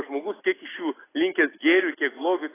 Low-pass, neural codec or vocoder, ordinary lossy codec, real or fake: 3.6 kHz; none; AAC, 16 kbps; real